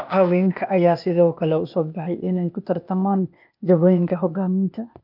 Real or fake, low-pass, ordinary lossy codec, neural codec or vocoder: fake; 5.4 kHz; none; codec, 16 kHz, 0.8 kbps, ZipCodec